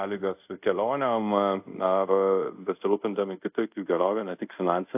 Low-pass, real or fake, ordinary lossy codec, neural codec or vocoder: 3.6 kHz; fake; AAC, 32 kbps; codec, 24 kHz, 0.5 kbps, DualCodec